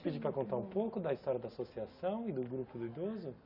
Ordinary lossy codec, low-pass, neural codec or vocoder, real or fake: none; 5.4 kHz; none; real